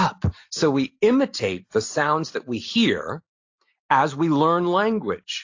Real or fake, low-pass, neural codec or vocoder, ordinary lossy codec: real; 7.2 kHz; none; AAC, 48 kbps